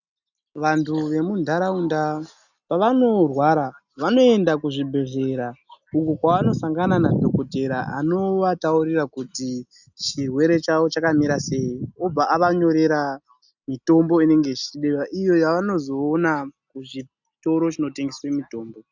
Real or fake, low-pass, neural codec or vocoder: real; 7.2 kHz; none